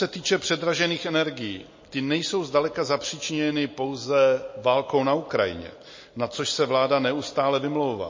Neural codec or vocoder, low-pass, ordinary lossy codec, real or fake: none; 7.2 kHz; MP3, 32 kbps; real